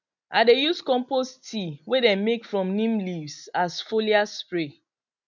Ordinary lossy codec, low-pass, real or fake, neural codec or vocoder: none; 7.2 kHz; real; none